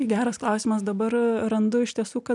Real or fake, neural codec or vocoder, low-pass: real; none; 10.8 kHz